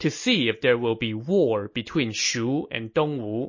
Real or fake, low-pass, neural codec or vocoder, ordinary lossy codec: real; 7.2 kHz; none; MP3, 32 kbps